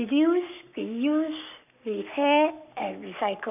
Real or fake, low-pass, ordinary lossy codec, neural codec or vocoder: fake; 3.6 kHz; none; codec, 44.1 kHz, 7.8 kbps, Pupu-Codec